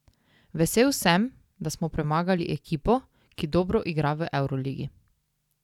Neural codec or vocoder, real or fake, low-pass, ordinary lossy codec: none; real; 19.8 kHz; none